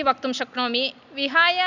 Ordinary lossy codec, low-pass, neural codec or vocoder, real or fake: none; 7.2 kHz; none; real